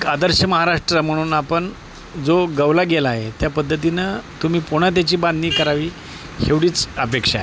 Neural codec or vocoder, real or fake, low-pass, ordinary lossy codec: none; real; none; none